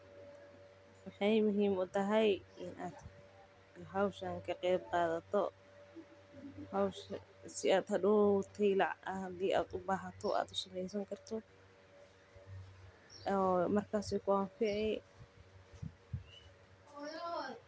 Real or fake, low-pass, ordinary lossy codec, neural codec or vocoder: real; none; none; none